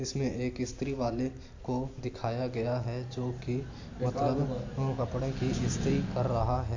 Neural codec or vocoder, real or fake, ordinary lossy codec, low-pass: none; real; AAC, 48 kbps; 7.2 kHz